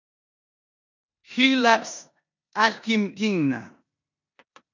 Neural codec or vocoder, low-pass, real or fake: codec, 16 kHz in and 24 kHz out, 0.9 kbps, LongCat-Audio-Codec, four codebook decoder; 7.2 kHz; fake